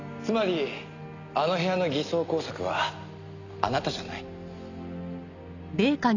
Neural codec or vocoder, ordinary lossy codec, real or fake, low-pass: none; none; real; 7.2 kHz